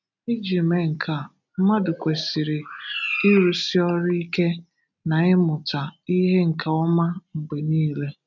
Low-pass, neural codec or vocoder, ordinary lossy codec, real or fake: 7.2 kHz; none; none; real